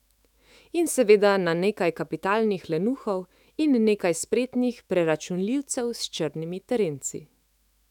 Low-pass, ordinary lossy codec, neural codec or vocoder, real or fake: 19.8 kHz; none; autoencoder, 48 kHz, 128 numbers a frame, DAC-VAE, trained on Japanese speech; fake